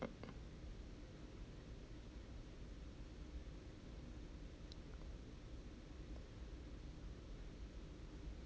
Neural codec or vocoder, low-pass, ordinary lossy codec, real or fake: none; none; none; real